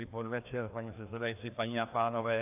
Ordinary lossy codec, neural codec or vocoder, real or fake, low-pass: AAC, 32 kbps; codec, 16 kHz, 2 kbps, FreqCodec, larger model; fake; 3.6 kHz